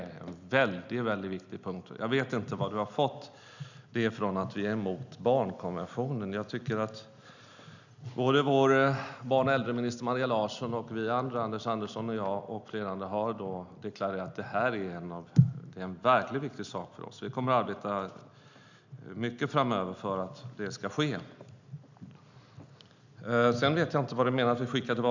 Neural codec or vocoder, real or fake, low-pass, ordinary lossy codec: none; real; 7.2 kHz; none